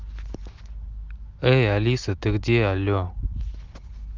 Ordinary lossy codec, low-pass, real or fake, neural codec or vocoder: Opus, 24 kbps; 7.2 kHz; real; none